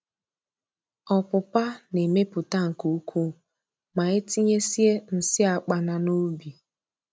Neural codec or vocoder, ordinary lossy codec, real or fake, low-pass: none; none; real; none